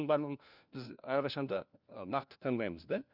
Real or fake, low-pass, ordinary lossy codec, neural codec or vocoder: fake; 5.4 kHz; Opus, 64 kbps; codec, 16 kHz, 1 kbps, FunCodec, trained on LibriTTS, 50 frames a second